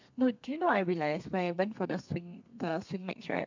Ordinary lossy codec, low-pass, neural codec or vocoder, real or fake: MP3, 64 kbps; 7.2 kHz; codec, 32 kHz, 1.9 kbps, SNAC; fake